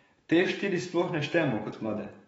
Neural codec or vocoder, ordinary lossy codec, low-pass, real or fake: autoencoder, 48 kHz, 128 numbers a frame, DAC-VAE, trained on Japanese speech; AAC, 24 kbps; 19.8 kHz; fake